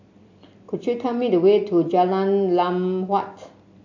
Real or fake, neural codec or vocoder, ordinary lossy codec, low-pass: real; none; AAC, 48 kbps; 7.2 kHz